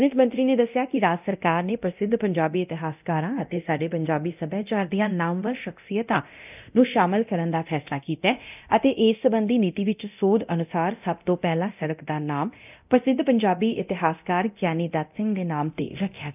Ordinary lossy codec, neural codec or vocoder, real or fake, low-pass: none; codec, 24 kHz, 0.9 kbps, DualCodec; fake; 3.6 kHz